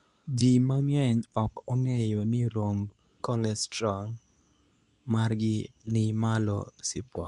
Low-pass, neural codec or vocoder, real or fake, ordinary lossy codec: 10.8 kHz; codec, 24 kHz, 0.9 kbps, WavTokenizer, medium speech release version 2; fake; none